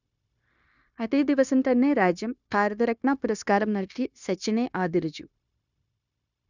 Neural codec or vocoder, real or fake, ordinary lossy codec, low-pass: codec, 16 kHz, 0.9 kbps, LongCat-Audio-Codec; fake; none; 7.2 kHz